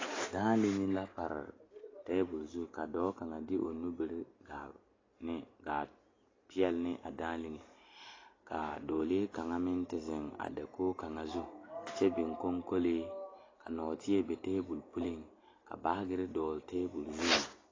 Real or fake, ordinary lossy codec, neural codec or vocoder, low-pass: real; AAC, 32 kbps; none; 7.2 kHz